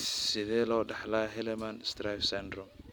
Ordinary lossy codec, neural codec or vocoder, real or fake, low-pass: none; none; real; none